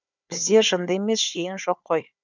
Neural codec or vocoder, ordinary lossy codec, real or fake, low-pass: codec, 16 kHz, 4 kbps, FunCodec, trained on Chinese and English, 50 frames a second; none; fake; 7.2 kHz